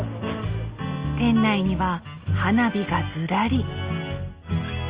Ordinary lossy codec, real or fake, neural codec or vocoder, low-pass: Opus, 32 kbps; real; none; 3.6 kHz